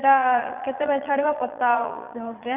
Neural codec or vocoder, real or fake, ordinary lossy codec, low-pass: vocoder, 22.05 kHz, 80 mel bands, Vocos; fake; none; 3.6 kHz